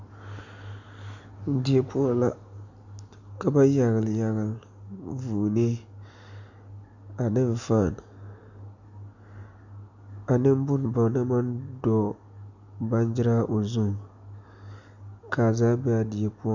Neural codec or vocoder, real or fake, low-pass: none; real; 7.2 kHz